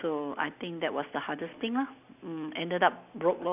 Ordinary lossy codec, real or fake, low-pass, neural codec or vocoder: none; real; 3.6 kHz; none